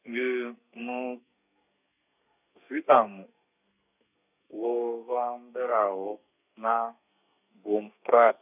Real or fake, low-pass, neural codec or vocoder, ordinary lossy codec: fake; 3.6 kHz; codec, 32 kHz, 1.9 kbps, SNAC; none